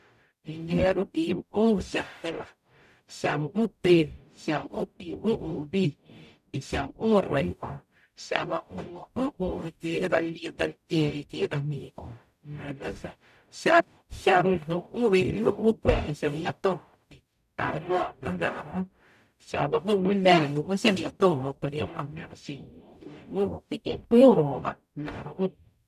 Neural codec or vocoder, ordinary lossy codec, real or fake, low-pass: codec, 44.1 kHz, 0.9 kbps, DAC; none; fake; 14.4 kHz